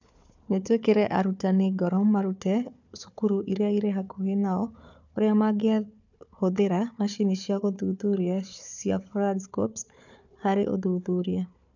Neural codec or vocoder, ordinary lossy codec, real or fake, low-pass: codec, 16 kHz, 4 kbps, FunCodec, trained on Chinese and English, 50 frames a second; none; fake; 7.2 kHz